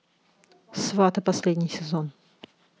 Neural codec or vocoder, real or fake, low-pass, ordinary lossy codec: none; real; none; none